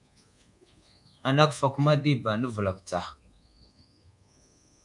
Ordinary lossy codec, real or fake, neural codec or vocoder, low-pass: MP3, 96 kbps; fake; codec, 24 kHz, 1.2 kbps, DualCodec; 10.8 kHz